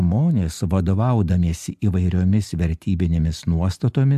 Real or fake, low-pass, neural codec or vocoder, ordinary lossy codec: real; 14.4 kHz; none; AAC, 96 kbps